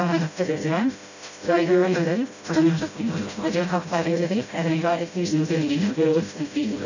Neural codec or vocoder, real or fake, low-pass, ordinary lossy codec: codec, 16 kHz, 0.5 kbps, FreqCodec, smaller model; fake; 7.2 kHz; none